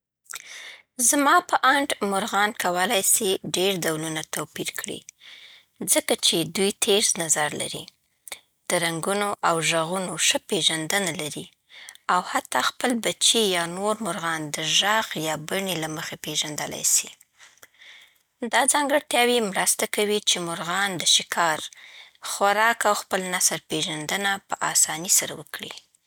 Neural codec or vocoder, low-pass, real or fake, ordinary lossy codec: none; none; real; none